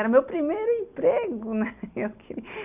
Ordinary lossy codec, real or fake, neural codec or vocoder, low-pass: none; real; none; 3.6 kHz